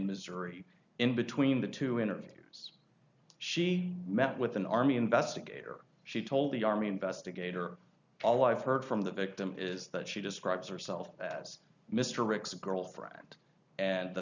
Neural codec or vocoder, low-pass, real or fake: none; 7.2 kHz; real